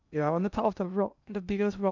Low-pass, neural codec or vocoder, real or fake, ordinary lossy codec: 7.2 kHz; codec, 16 kHz in and 24 kHz out, 0.8 kbps, FocalCodec, streaming, 65536 codes; fake; none